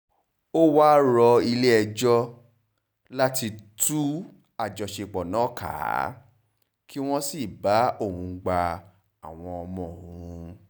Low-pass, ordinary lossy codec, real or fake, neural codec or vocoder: none; none; real; none